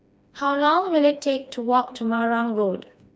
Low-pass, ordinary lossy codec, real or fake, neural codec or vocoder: none; none; fake; codec, 16 kHz, 2 kbps, FreqCodec, smaller model